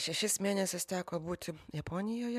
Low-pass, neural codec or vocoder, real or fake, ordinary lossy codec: 14.4 kHz; none; real; MP3, 96 kbps